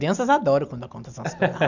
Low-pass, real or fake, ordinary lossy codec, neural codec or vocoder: 7.2 kHz; real; none; none